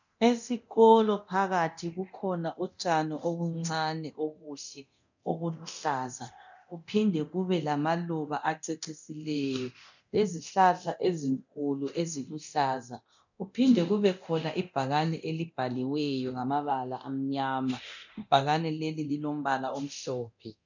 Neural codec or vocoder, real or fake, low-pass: codec, 24 kHz, 0.9 kbps, DualCodec; fake; 7.2 kHz